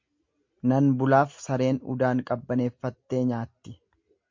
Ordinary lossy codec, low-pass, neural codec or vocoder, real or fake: MP3, 48 kbps; 7.2 kHz; none; real